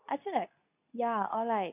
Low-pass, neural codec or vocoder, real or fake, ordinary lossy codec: 3.6 kHz; codec, 24 kHz, 0.9 kbps, WavTokenizer, medium speech release version 2; fake; none